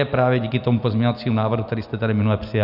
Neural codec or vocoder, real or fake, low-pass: none; real; 5.4 kHz